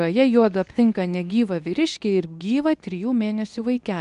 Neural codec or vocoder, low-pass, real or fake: codec, 24 kHz, 0.9 kbps, WavTokenizer, medium speech release version 2; 10.8 kHz; fake